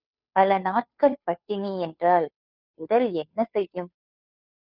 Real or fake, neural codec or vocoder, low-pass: fake; codec, 16 kHz, 2 kbps, FunCodec, trained on Chinese and English, 25 frames a second; 5.4 kHz